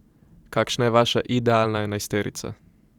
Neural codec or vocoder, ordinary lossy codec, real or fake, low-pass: vocoder, 44.1 kHz, 128 mel bands every 512 samples, BigVGAN v2; Opus, 64 kbps; fake; 19.8 kHz